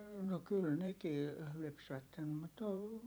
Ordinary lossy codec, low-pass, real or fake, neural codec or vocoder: none; none; fake; vocoder, 44.1 kHz, 128 mel bands every 512 samples, BigVGAN v2